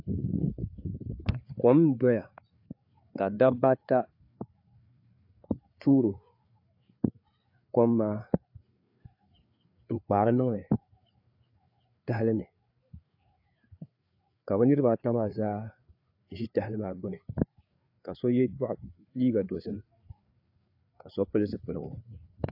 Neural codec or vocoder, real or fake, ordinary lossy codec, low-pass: codec, 16 kHz, 4 kbps, FreqCodec, larger model; fake; AAC, 48 kbps; 5.4 kHz